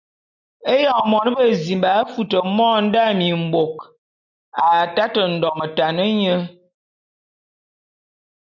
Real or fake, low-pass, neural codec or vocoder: real; 7.2 kHz; none